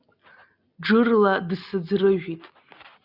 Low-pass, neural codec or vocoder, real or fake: 5.4 kHz; none; real